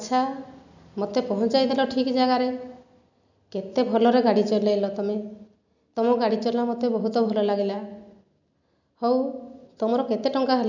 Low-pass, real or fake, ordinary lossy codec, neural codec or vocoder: 7.2 kHz; real; none; none